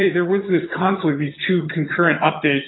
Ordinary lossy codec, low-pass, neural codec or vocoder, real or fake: AAC, 16 kbps; 7.2 kHz; vocoder, 22.05 kHz, 80 mel bands, HiFi-GAN; fake